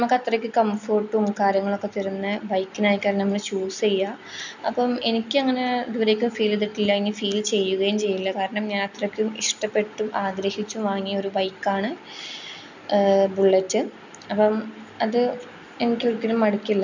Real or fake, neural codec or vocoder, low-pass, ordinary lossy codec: real; none; 7.2 kHz; none